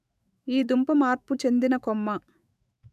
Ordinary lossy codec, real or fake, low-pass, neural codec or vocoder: none; fake; 14.4 kHz; autoencoder, 48 kHz, 128 numbers a frame, DAC-VAE, trained on Japanese speech